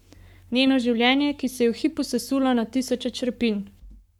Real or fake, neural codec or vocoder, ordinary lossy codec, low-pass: fake; codec, 44.1 kHz, 7.8 kbps, Pupu-Codec; none; 19.8 kHz